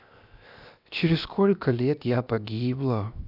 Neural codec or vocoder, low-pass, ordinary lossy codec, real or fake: codec, 16 kHz, 0.7 kbps, FocalCodec; 5.4 kHz; none; fake